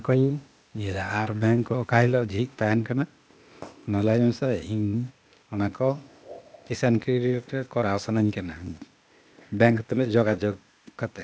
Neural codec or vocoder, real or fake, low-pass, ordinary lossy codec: codec, 16 kHz, 0.8 kbps, ZipCodec; fake; none; none